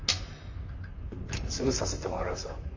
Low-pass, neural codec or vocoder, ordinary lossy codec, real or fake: 7.2 kHz; vocoder, 44.1 kHz, 128 mel bands, Pupu-Vocoder; AAC, 48 kbps; fake